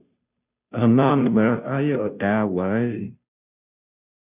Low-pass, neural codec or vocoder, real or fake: 3.6 kHz; codec, 16 kHz, 0.5 kbps, FunCodec, trained on Chinese and English, 25 frames a second; fake